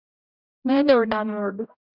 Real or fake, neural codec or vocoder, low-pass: fake; codec, 16 kHz, 0.5 kbps, X-Codec, HuBERT features, trained on general audio; 5.4 kHz